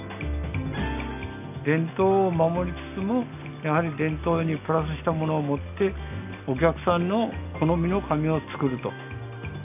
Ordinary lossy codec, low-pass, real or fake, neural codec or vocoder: none; 3.6 kHz; real; none